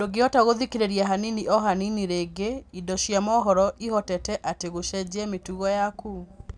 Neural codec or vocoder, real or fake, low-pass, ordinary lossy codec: none; real; 9.9 kHz; none